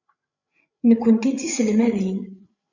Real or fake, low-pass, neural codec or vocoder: fake; 7.2 kHz; codec, 16 kHz, 8 kbps, FreqCodec, larger model